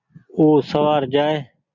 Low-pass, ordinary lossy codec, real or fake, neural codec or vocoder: 7.2 kHz; Opus, 64 kbps; real; none